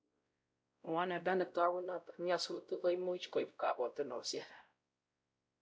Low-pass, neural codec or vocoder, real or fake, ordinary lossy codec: none; codec, 16 kHz, 0.5 kbps, X-Codec, WavLM features, trained on Multilingual LibriSpeech; fake; none